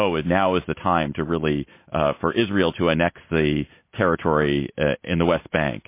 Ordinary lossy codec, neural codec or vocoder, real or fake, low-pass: MP3, 24 kbps; none; real; 3.6 kHz